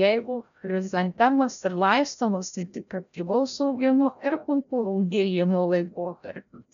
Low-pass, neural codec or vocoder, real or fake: 7.2 kHz; codec, 16 kHz, 0.5 kbps, FreqCodec, larger model; fake